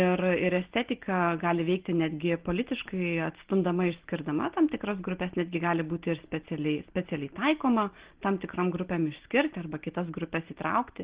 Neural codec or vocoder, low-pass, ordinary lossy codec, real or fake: none; 3.6 kHz; Opus, 16 kbps; real